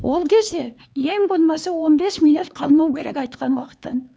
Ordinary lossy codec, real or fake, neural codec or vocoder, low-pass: none; fake; codec, 16 kHz, 4 kbps, X-Codec, HuBERT features, trained on LibriSpeech; none